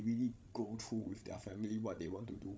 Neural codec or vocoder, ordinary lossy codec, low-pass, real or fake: codec, 16 kHz, 16 kbps, FreqCodec, larger model; none; none; fake